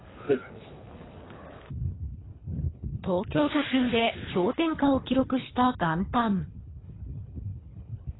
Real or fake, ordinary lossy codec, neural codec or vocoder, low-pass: fake; AAC, 16 kbps; codec, 24 kHz, 3 kbps, HILCodec; 7.2 kHz